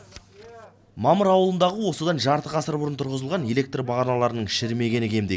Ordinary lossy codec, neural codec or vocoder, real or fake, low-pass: none; none; real; none